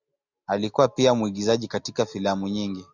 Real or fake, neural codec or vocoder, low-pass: real; none; 7.2 kHz